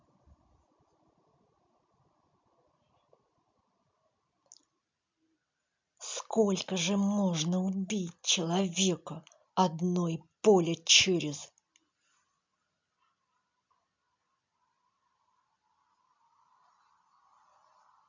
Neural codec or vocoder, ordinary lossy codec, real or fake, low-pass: none; MP3, 64 kbps; real; 7.2 kHz